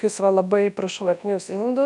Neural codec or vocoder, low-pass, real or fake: codec, 24 kHz, 0.9 kbps, WavTokenizer, large speech release; 10.8 kHz; fake